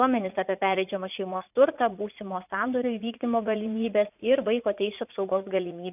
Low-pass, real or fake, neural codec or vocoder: 3.6 kHz; fake; vocoder, 44.1 kHz, 80 mel bands, Vocos